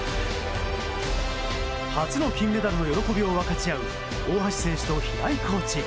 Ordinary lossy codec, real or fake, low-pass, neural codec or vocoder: none; real; none; none